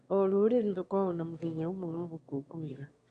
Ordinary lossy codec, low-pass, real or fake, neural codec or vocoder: Opus, 64 kbps; 9.9 kHz; fake; autoencoder, 22.05 kHz, a latent of 192 numbers a frame, VITS, trained on one speaker